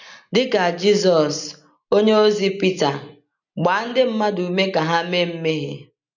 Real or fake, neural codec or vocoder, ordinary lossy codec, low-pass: real; none; none; 7.2 kHz